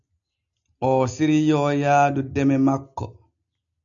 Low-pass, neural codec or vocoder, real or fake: 7.2 kHz; none; real